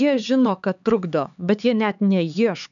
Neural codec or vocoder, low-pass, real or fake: codec, 16 kHz, 4 kbps, X-Codec, HuBERT features, trained on LibriSpeech; 7.2 kHz; fake